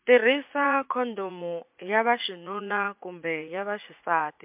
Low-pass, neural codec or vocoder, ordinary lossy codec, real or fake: 3.6 kHz; vocoder, 44.1 kHz, 80 mel bands, Vocos; MP3, 32 kbps; fake